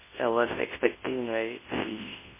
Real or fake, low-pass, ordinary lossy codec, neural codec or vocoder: fake; 3.6 kHz; MP3, 16 kbps; codec, 24 kHz, 0.9 kbps, WavTokenizer, large speech release